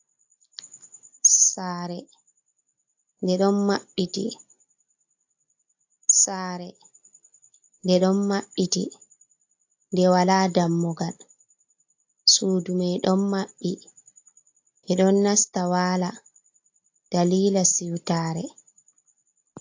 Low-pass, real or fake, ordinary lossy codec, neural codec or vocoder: 7.2 kHz; real; AAC, 48 kbps; none